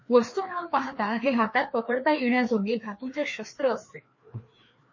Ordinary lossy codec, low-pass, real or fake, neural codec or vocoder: MP3, 32 kbps; 7.2 kHz; fake; codec, 16 kHz, 2 kbps, FreqCodec, larger model